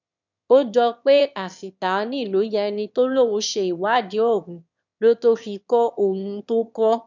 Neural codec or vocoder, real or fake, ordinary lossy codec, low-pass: autoencoder, 22.05 kHz, a latent of 192 numbers a frame, VITS, trained on one speaker; fake; none; 7.2 kHz